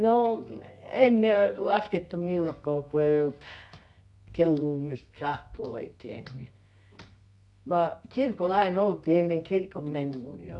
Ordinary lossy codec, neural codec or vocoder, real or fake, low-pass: none; codec, 24 kHz, 0.9 kbps, WavTokenizer, medium music audio release; fake; 10.8 kHz